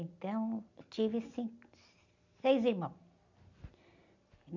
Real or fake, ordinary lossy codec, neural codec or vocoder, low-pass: real; none; none; 7.2 kHz